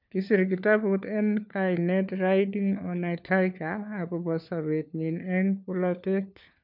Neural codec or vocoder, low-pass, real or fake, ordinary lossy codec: codec, 16 kHz, 4 kbps, FunCodec, trained on LibriTTS, 50 frames a second; 5.4 kHz; fake; none